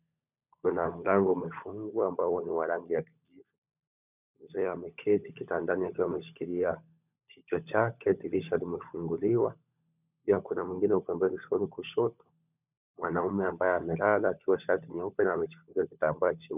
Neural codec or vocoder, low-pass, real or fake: codec, 16 kHz, 16 kbps, FunCodec, trained on LibriTTS, 50 frames a second; 3.6 kHz; fake